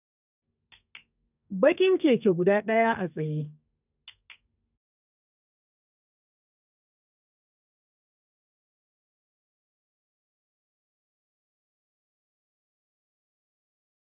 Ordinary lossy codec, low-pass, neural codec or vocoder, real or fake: none; 3.6 kHz; codec, 32 kHz, 1.9 kbps, SNAC; fake